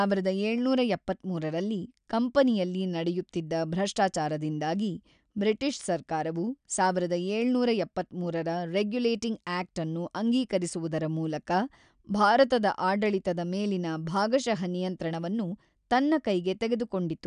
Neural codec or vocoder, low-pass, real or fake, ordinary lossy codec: none; 9.9 kHz; real; none